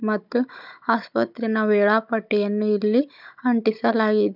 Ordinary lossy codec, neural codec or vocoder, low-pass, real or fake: none; codec, 16 kHz, 16 kbps, FunCodec, trained on Chinese and English, 50 frames a second; 5.4 kHz; fake